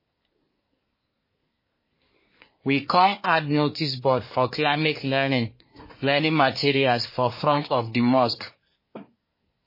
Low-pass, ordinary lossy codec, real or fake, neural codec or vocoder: 5.4 kHz; MP3, 24 kbps; fake; codec, 24 kHz, 1 kbps, SNAC